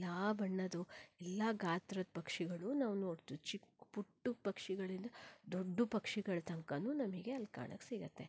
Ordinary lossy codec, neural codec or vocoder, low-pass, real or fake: none; none; none; real